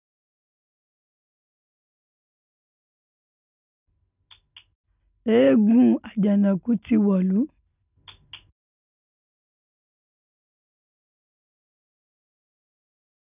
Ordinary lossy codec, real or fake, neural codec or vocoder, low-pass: none; real; none; 3.6 kHz